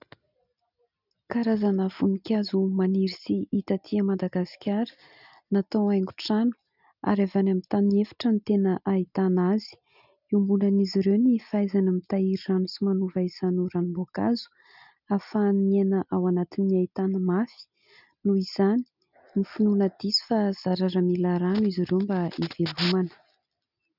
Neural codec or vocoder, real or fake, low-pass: none; real; 5.4 kHz